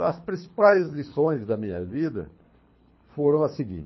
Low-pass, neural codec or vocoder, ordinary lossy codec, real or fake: 7.2 kHz; codec, 24 kHz, 3 kbps, HILCodec; MP3, 24 kbps; fake